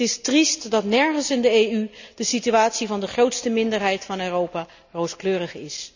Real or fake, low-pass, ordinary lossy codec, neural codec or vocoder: real; 7.2 kHz; none; none